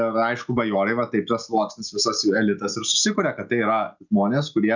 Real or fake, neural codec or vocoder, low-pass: real; none; 7.2 kHz